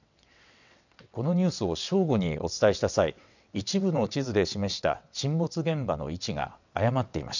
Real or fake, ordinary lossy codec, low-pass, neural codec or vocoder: fake; none; 7.2 kHz; vocoder, 22.05 kHz, 80 mel bands, WaveNeXt